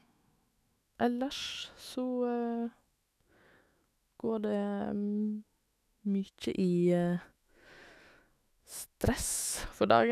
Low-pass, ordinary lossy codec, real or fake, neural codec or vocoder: 14.4 kHz; none; fake; autoencoder, 48 kHz, 128 numbers a frame, DAC-VAE, trained on Japanese speech